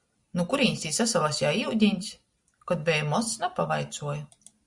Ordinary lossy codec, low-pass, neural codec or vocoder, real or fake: Opus, 64 kbps; 10.8 kHz; none; real